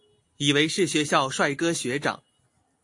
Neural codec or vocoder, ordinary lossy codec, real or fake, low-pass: none; AAC, 48 kbps; real; 10.8 kHz